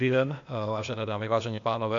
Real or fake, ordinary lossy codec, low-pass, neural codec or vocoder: fake; MP3, 64 kbps; 7.2 kHz; codec, 16 kHz, 0.8 kbps, ZipCodec